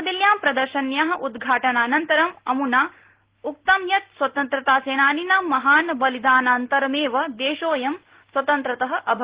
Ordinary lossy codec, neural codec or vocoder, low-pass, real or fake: Opus, 16 kbps; none; 3.6 kHz; real